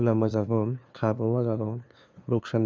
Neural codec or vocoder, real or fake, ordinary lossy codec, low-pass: codec, 16 kHz, 2 kbps, FunCodec, trained on LibriTTS, 25 frames a second; fake; none; none